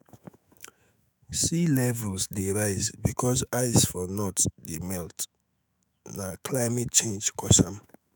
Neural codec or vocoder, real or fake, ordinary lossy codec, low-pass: autoencoder, 48 kHz, 128 numbers a frame, DAC-VAE, trained on Japanese speech; fake; none; none